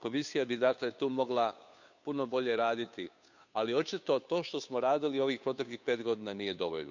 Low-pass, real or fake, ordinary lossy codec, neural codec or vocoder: 7.2 kHz; fake; none; codec, 16 kHz, 2 kbps, FunCodec, trained on Chinese and English, 25 frames a second